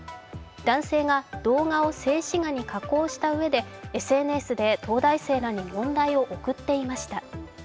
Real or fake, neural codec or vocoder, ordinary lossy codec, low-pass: real; none; none; none